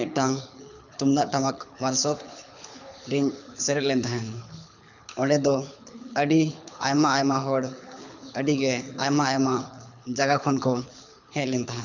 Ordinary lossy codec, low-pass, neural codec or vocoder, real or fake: none; 7.2 kHz; codec, 24 kHz, 6 kbps, HILCodec; fake